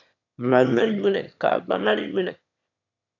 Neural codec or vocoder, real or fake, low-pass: autoencoder, 22.05 kHz, a latent of 192 numbers a frame, VITS, trained on one speaker; fake; 7.2 kHz